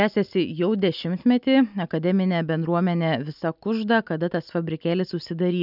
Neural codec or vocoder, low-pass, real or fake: none; 5.4 kHz; real